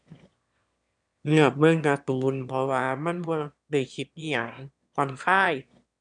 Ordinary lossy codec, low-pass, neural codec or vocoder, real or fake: MP3, 96 kbps; 9.9 kHz; autoencoder, 22.05 kHz, a latent of 192 numbers a frame, VITS, trained on one speaker; fake